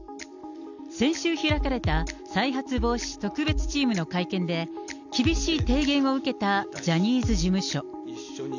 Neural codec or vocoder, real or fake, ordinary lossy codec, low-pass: none; real; none; 7.2 kHz